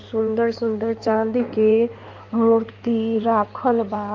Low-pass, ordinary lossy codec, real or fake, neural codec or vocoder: 7.2 kHz; Opus, 24 kbps; fake; codec, 16 kHz in and 24 kHz out, 2.2 kbps, FireRedTTS-2 codec